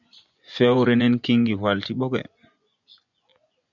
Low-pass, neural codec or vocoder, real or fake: 7.2 kHz; vocoder, 24 kHz, 100 mel bands, Vocos; fake